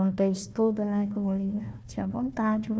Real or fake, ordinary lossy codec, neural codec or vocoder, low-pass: fake; none; codec, 16 kHz, 1 kbps, FunCodec, trained on Chinese and English, 50 frames a second; none